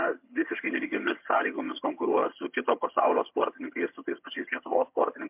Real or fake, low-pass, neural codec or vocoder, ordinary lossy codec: fake; 3.6 kHz; vocoder, 22.05 kHz, 80 mel bands, HiFi-GAN; MP3, 32 kbps